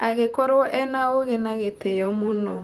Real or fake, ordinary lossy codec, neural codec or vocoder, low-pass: fake; Opus, 32 kbps; vocoder, 44.1 kHz, 128 mel bands, Pupu-Vocoder; 14.4 kHz